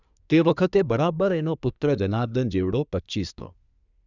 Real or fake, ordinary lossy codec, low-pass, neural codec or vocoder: fake; none; 7.2 kHz; codec, 24 kHz, 1 kbps, SNAC